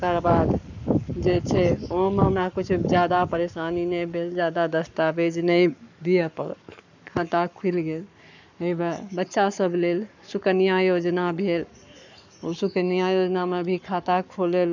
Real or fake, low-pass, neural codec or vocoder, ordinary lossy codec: fake; 7.2 kHz; codec, 44.1 kHz, 7.8 kbps, Pupu-Codec; none